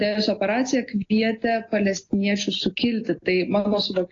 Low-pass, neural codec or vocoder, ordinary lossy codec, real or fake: 7.2 kHz; none; AAC, 32 kbps; real